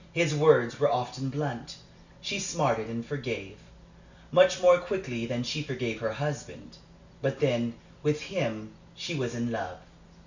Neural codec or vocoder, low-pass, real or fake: none; 7.2 kHz; real